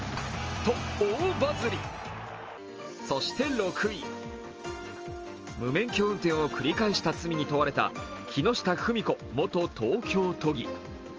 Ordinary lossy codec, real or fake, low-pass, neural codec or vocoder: Opus, 24 kbps; real; 7.2 kHz; none